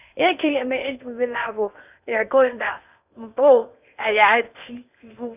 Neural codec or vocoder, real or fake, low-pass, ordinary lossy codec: codec, 16 kHz in and 24 kHz out, 0.6 kbps, FocalCodec, streaming, 2048 codes; fake; 3.6 kHz; none